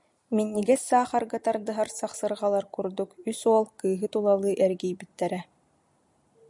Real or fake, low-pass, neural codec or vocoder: real; 10.8 kHz; none